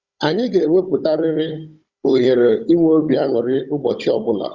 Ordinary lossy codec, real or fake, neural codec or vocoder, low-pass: Opus, 64 kbps; fake; codec, 16 kHz, 16 kbps, FunCodec, trained on Chinese and English, 50 frames a second; 7.2 kHz